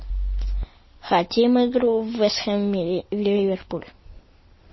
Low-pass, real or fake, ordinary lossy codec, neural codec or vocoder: 7.2 kHz; real; MP3, 24 kbps; none